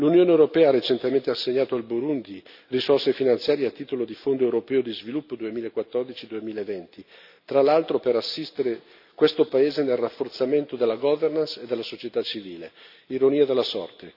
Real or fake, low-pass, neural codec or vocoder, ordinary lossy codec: real; 5.4 kHz; none; none